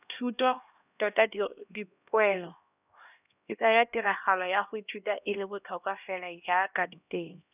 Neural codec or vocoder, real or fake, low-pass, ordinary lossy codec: codec, 16 kHz, 1 kbps, X-Codec, HuBERT features, trained on LibriSpeech; fake; 3.6 kHz; none